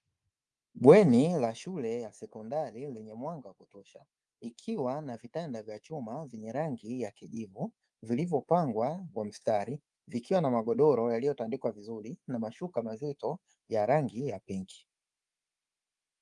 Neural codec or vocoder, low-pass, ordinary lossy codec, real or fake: codec, 24 kHz, 3.1 kbps, DualCodec; 10.8 kHz; Opus, 32 kbps; fake